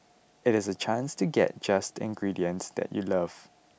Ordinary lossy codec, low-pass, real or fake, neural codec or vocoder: none; none; real; none